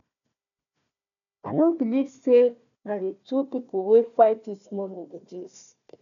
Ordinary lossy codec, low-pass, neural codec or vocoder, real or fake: none; 7.2 kHz; codec, 16 kHz, 1 kbps, FunCodec, trained on Chinese and English, 50 frames a second; fake